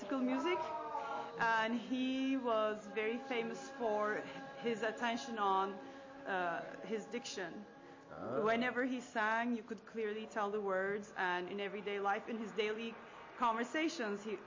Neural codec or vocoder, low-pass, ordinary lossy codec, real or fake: none; 7.2 kHz; MP3, 32 kbps; real